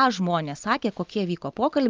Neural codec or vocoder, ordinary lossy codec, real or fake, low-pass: none; Opus, 32 kbps; real; 7.2 kHz